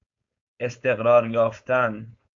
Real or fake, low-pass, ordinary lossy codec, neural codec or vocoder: fake; 7.2 kHz; AAC, 64 kbps; codec, 16 kHz, 4.8 kbps, FACodec